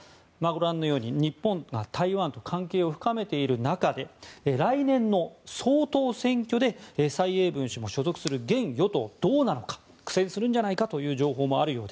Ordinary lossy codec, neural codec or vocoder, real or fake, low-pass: none; none; real; none